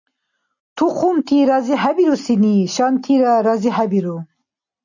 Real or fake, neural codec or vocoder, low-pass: real; none; 7.2 kHz